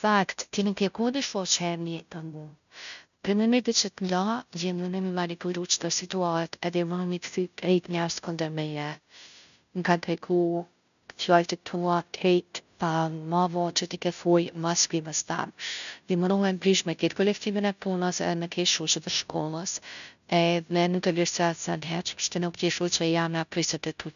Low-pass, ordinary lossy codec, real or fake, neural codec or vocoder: 7.2 kHz; none; fake; codec, 16 kHz, 0.5 kbps, FunCodec, trained on Chinese and English, 25 frames a second